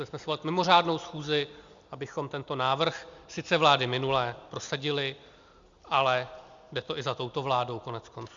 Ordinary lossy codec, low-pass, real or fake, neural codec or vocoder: Opus, 64 kbps; 7.2 kHz; real; none